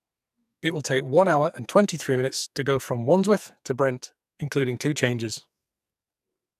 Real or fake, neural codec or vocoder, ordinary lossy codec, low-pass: fake; codec, 44.1 kHz, 2.6 kbps, SNAC; none; 14.4 kHz